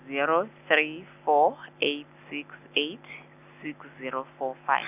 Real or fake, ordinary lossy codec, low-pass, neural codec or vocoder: real; none; 3.6 kHz; none